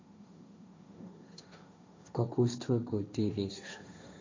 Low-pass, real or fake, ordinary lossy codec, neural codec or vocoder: 7.2 kHz; fake; MP3, 64 kbps; codec, 16 kHz, 1.1 kbps, Voila-Tokenizer